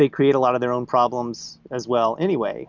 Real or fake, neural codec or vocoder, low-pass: real; none; 7.2 kHz